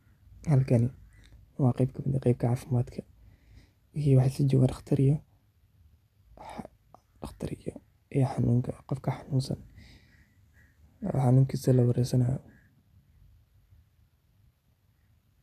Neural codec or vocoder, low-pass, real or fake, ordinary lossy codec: none; 14.4 kHz; real; none